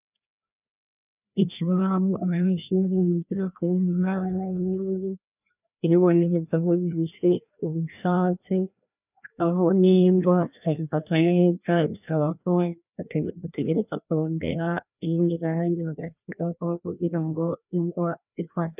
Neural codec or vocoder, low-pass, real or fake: codec, 16 kHz, 1 kbps, FreqCodec, larger model; 3.6 kHz; fake